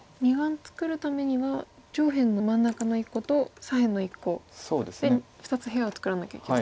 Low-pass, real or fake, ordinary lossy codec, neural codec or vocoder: none; real; none; none